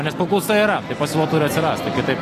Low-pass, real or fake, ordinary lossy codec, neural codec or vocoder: 14.4 kHz; real; AAC, 48 kbps; none